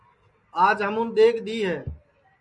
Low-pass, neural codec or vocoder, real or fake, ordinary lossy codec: 10.8 kHz; none; real; MP3, 96 kbps